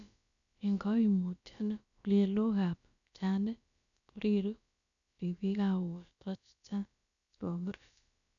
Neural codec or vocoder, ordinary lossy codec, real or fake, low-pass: codec, 16 kHz, about 1 kbps, DyCAST, with the encoder's durations; none; fake; 7.2 kHz